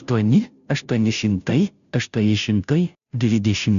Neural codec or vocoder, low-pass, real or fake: codec, 16 kHz, 0.5 kbps, FunCodec, trained on Chinese and English, 25 frames a second; 7.2 kHz; fake